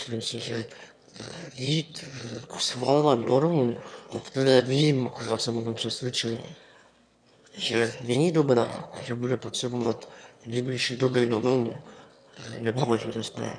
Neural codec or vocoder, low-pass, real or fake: autoencoder, 22.05 kHz, a latent of 192 numbers a frame, VITS, trained on one speaker; 9.9 kHz; fake